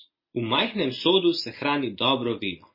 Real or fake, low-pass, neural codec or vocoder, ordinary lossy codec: real; 5.4 kHz; none; MP3, 24 kbps